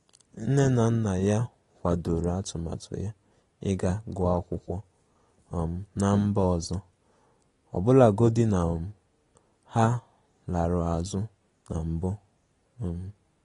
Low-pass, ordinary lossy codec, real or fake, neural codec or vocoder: 10.8 kHz; AAC, 32 kbps; real; none